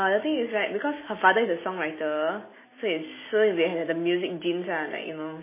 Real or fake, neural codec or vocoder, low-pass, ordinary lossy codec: real; none; 3.6 kHz; MP3, 16 kbps